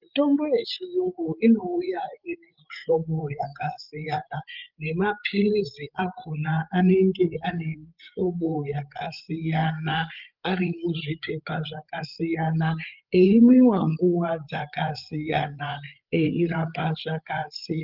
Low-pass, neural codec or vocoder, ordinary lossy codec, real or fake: 5.4 kHz; codec, 16 kHz, 8 kbps, FreqCodec, larger model; Opus, 32 kbps; fake